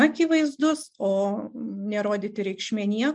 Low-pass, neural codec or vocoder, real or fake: 10.8 kHz; none; real